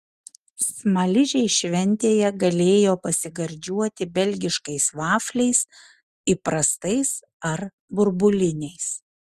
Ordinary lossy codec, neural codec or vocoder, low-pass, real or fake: Opus, 32 kbps; none; 14.4 kHz; real